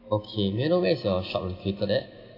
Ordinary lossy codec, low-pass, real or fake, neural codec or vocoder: AAC, 32 kbps; 5.4 kHz; real; none